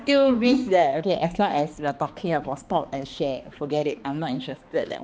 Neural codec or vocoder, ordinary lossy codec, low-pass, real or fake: codec, 16 kHz, 2 kbps, X-Codec, HuBERT features, trained on balanced general audio; none; none; fake